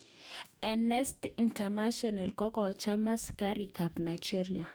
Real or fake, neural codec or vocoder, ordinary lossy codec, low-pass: fake; codec, 44.1 kHz, 2.6 kbps, DAC; none; none